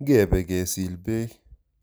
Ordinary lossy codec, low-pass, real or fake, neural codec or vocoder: none; none; real; none